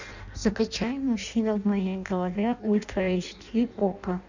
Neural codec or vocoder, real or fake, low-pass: codec, 16 kHz in and 24 kHz out, 0.6 kbps, FireRedTTS-2 codec; fake; 7.2 kHz